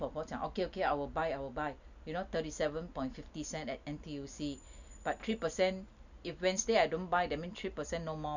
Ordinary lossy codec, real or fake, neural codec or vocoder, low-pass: none; real; none; 7.2 kHz